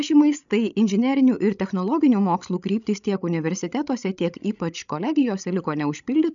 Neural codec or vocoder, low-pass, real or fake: codec, 16 kHz, 16 kbps, FreqCodec, larger model; 7.2 kHz; fake